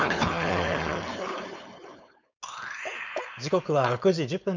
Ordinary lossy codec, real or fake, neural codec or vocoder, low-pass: MP3, 64 kbps; fake; codec, 16 kHz, 4.8 kbps, FACodec; 7.2 kHz